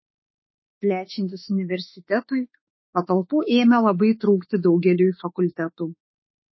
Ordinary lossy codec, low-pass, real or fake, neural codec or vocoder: MP3, 24 kbps; 7.2 kHz; fake; autoencoder, 48 kHz, 32 numbers a frame, DAC-VAE, trained on Japanese speech